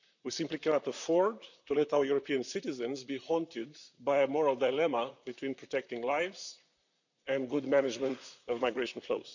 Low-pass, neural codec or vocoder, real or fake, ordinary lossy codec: 7.2 kHz; vocoder, 44.1 kHz, 128 mel bands, Pupu-Vocoder; fake; none